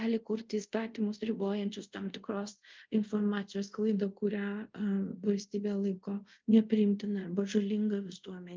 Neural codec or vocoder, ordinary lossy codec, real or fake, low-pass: codec, 24 kHz, 0.5 kbps, DualCodec; Opus, 24 kbps; fake; 7.2 kHz